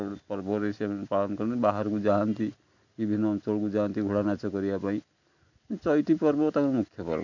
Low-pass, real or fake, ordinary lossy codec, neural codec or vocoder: 7.2 kHz; real; none; none